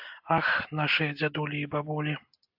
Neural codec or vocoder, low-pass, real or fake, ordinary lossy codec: none; 5.4 kHz; real; AAC, 48 kbps